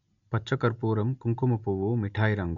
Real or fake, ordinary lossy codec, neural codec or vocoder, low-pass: real; none; none; 7.2 kHz